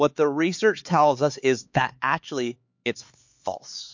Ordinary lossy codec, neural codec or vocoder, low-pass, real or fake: MP3, 48 kbps; codec, 16 kHz, 4 kbps, X-Codec, HuBERT features, trained on LibriSpeech; 7.2 kHz; fake